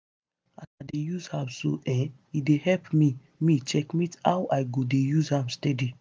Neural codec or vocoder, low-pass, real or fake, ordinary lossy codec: none; none; real; none